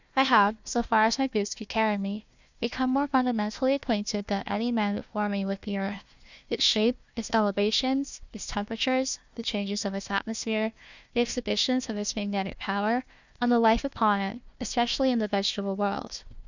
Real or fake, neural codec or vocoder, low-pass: fake; codec, 16 kHz, 1 kbps, FunCodec, trained on Chinese and English, 50 frames a second; 7.2 kHz